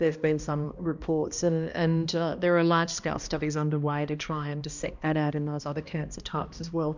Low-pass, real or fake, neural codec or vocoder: 7.2 kHz; fake; codec, 16 kHz, 1 kbps, X-Codec, HuBERT features, trained on balanced general audio